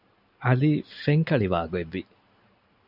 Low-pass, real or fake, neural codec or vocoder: 5.4 kHz; real; none